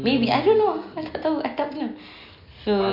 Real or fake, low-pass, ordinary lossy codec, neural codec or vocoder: real; 5.4 kHz; MP3, 48 kbps; none